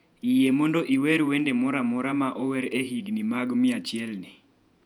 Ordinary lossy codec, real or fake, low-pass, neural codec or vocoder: none; fake; 19.8 kHz; vocoder, 48 kHz, 128 mel bands, Vocos